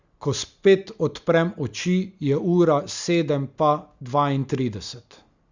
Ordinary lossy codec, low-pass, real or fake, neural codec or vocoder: Opus, 64 kbps; 7.2 kHz; real; none